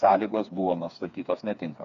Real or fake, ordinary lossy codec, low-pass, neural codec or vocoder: fake; AAC, 96 kbps; 7.2 kHz; codec, 16 kHz, 4 kbps, FreqCodec, smaller model